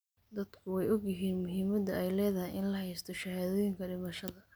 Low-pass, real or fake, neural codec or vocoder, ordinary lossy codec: none; real; none; none